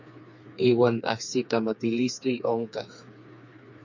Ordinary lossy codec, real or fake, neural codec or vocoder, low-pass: MP3, 64 kbps; fake; codec, 16 kHz, 4 kbps, FreqCodec, smaller model; 7.2 kHz